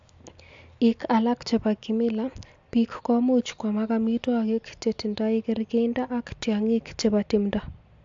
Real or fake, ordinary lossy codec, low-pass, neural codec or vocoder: real; AAC, 64 kbps; 7.2 kHz; none